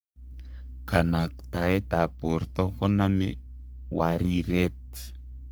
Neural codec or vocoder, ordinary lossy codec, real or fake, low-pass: codec, 44.1 kHz, 3.4 kbps, Pupu-Codec; none; fake; none